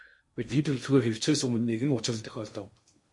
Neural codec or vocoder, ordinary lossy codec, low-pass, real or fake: codec, 16 kHz in and 24 kHz out, 0.6 kbps, FocalCodec, streaming, 2048 codes; MP3, 48 kbps; 10.8 kHz; fake